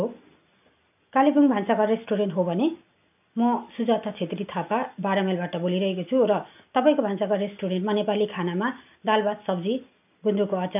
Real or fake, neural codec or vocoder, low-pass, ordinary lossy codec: real; none; 3.6 kHz; none